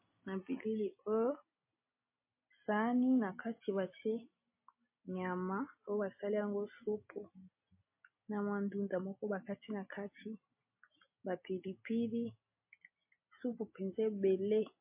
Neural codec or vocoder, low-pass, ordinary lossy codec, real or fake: none; 3.6 kHz; MP3, 24 kbps; real